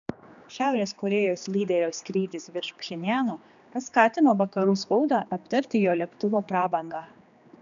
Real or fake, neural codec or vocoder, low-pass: fake; codec, 16 kHz, 2 kbps, X-Codec, HuBERT features, trained on general audio; 7.2 kHz